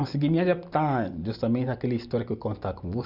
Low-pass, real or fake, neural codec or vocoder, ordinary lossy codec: 5.4 kHz; real; none; none